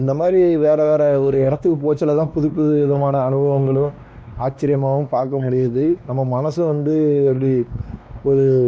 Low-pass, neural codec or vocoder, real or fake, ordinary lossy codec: none; codec, 16 kHz, 2 kbps, X-Codec, WavLM features, trained on Multilingual LibriSpeech; fake; none